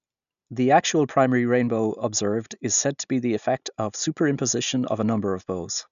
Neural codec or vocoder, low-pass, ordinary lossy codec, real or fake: none; 7.2 kHz; none; real